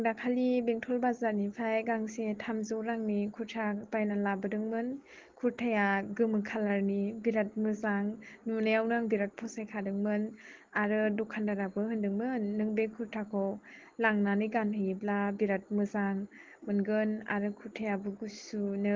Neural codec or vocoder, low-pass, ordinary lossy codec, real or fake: none; 7.2 kHz; Opus, 16 kbps; real